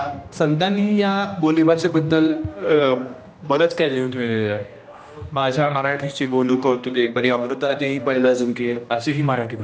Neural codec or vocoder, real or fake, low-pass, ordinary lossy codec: codec, 16 kHz, 1 kbps, X-Codec, HuBERT features, trained on general audio; fake; none; none